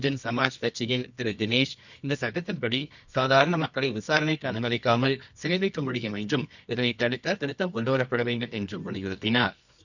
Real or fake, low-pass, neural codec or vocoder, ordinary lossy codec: fake; 7.2 kHz; codec, 24 kHz, 0.9 kbps, WavTokenizer, medium music audio release; none